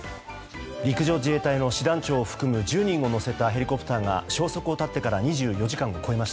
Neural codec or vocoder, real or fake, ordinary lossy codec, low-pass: none; real; none; none